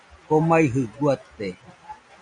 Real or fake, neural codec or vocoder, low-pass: real; none; 9.9 kHz